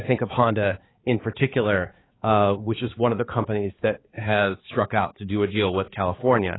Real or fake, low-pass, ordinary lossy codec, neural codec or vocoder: fake; 7.2 kHz; AAC, 16 kbps; codec, 16 kHz, 4 kbps, X-Codec, HuBERT features, trained on balanced general audio